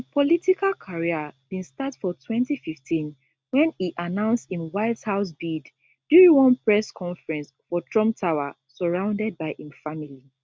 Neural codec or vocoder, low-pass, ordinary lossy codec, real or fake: none; none; none; real